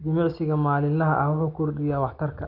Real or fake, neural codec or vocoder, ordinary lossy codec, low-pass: real; none; Opus, 32 kbps; 5.4 kHz